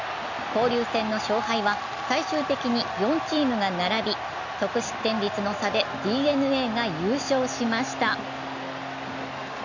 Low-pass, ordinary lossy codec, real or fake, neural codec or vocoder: 7.2 kHz; none; real; none